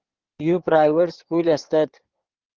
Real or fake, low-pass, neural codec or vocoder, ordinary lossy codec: fake; 7.2 kHz; codec, 16 kHz in and 24 kHz out, 2.2 kbps, FireRedTTS-2 codec; Opus, 16 kbps